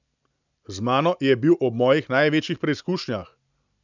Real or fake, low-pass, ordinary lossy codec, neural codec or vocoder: real; 7.2 kHz; none; none